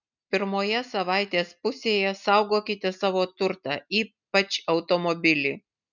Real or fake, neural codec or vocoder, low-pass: real; none; 7.2 kHz